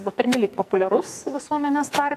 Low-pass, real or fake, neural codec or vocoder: 14.4 kHz; fake; codec, 44.1 kHz, 2.6 kbps, SNAC